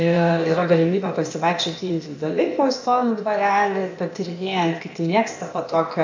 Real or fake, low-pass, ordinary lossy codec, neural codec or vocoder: fake; 7.2 kHz; MP3, 48 kbps; codec, 16 kHz, 0.8 kbps, ZipCodec